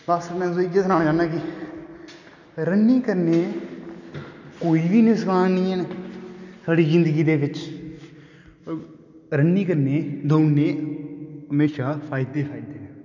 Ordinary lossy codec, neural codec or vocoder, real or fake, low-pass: none; none; real; 7.2 kHz